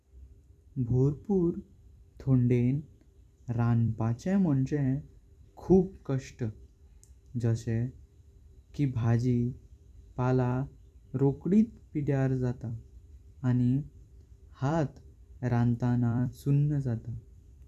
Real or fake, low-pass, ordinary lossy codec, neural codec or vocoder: fake; 14.4 kHz; none; vocoder, 44.1 kHz, 128 mel bands every 256 samples, BigVGAN v2